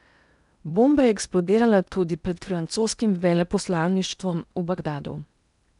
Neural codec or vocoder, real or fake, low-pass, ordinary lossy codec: codec, 16 kHz in and 24 kHz out, 0.8 kbps, FocalCodec, streaming, 65536 codes; fake; 10.8 kHz; none